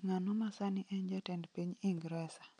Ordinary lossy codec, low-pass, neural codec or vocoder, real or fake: none; 9.9 kHz; none; real